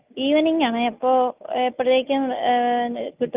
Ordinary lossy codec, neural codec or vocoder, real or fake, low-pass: Opus, 24 kbps; none; real; 3.6 kHz